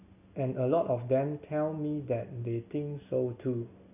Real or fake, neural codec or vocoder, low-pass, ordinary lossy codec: real; none; 3.6 kHz; none